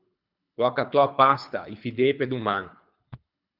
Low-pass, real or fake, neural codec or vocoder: 5.4 kHz; fake; codec, 24 kHz, 3 kbps, HILCodec